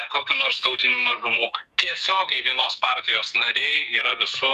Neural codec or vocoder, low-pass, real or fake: codec, 44.1 kHz, 2.6 kbps, SNAC; 14.4 kHz; fake